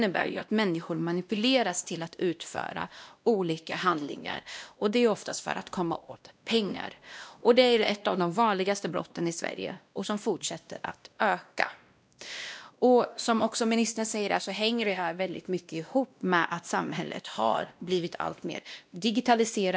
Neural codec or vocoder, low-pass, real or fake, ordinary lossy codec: codec, 16 kHz, 1 kbps, X-Codec, WavLM features, trained on Multilingual LibriSpeech; none; fake; none